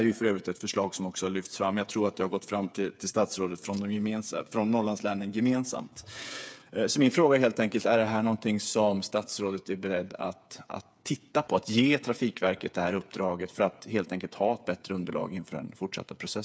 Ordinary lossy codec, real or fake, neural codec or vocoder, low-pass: none; fake; codec, 16 kHz, 8 kbps, FreqCodec, smaller model; none